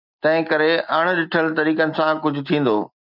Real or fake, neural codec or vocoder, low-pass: real; none; 5.4 kHz